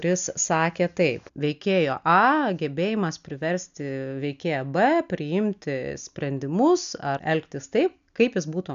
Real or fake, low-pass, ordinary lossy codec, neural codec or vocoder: real; 7.2 kHz; AAC, 96 kbps; none